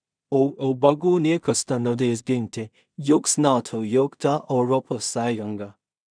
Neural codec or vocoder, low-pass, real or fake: codec, 16 kHz in and 24 kHz out, 0.4 kbps, LongCat-Audio-Codec, two codebook decoder; 9.9 kHz; fake